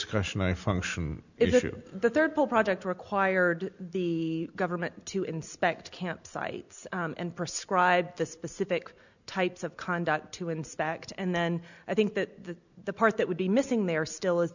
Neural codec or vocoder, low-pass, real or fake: none; 7.2 kHz; real